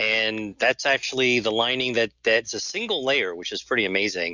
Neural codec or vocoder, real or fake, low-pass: none; real; 7.2 kHz